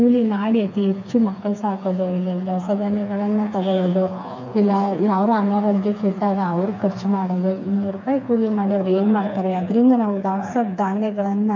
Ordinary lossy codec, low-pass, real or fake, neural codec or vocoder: MP3, 64 kbps; 7.2 kHz; fake; codec, 16 kHz, 4 kbps, FreqCodec, smaller model